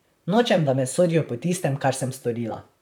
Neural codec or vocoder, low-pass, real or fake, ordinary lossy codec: vocoder, 44.1 kHz, 128 mel bands, Pupu-Vocoder; 19.8 kHz; fake; none